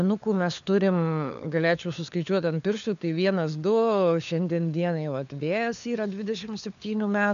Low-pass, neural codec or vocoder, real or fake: 7.2 kHz; codec, 16 kHz, 4 kbps, FunCodec, trained on LibriTTS, 50 frames a second; fake